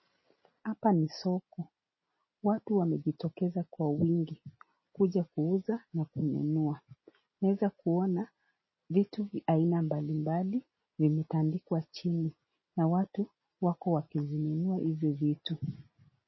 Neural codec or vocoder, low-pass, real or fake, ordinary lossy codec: none; 7.2 kHz; real; MP3, 24 kbps